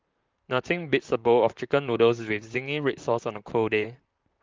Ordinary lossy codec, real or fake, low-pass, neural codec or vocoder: Opus, 24 kbps; fake; 7.2 kHz; vocoder, 44.1 kHz, 128 mel bands, Pupu-Vocoder